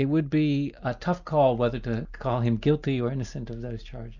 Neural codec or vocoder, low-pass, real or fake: none; 7.2 kHz; real